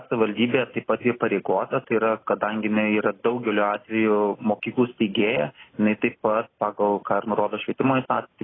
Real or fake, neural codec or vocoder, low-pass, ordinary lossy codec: real; none; 7.2 kHz; AAC, 16 kbps